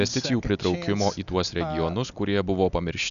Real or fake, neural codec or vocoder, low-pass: real; none; 7.2 kHz